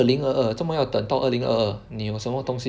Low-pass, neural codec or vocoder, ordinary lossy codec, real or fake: none; none; none; real